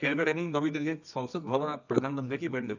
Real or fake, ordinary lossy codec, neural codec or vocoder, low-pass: fake; none; codec, 24 kHz, 0.9 kbps, WavTokenizer, medium music audio release; 7.2 kHz